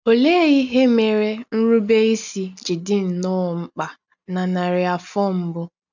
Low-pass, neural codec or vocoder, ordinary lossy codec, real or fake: 7.2 kHz; none; none; real